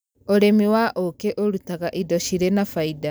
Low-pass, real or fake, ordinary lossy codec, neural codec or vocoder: none; real; none; none